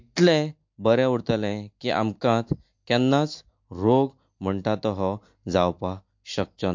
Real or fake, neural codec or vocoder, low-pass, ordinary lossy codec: real; none; 7.2 kHz; MP3, 48 kbps